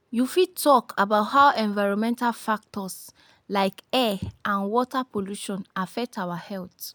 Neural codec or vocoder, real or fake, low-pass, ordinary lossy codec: none; real; none; none